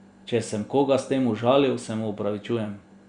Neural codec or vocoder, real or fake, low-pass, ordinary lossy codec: none; real; 9.9 kHz; Opus, 64 kbps